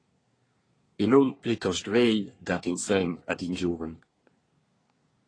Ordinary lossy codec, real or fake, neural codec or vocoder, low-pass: AAC, 32 kbps; fake; codec, 24 kHz, 1 kbps, SNAC; 9.9 kHz